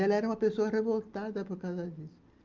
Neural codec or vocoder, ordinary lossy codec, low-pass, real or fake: none; Opus, 24 kbps; 7.2 kHz; real